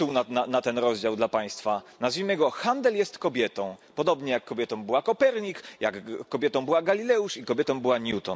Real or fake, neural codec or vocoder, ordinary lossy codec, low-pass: real; none; none; none